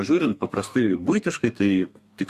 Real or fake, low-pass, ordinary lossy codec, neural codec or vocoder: fake; 14.4 kHz; Opus, 64 kbps; codec, 32 kHz, 1.9 kbps, SNAC